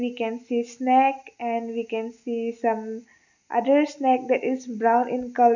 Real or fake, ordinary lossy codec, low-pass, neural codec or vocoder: real; none; 7.2 kHz; none